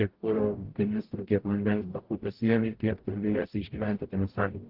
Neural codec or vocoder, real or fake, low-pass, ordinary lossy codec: codec, 44.1 kHz, 0.9 kbps, DAC; fake; 5.4 kHz; Opus, 16 kbps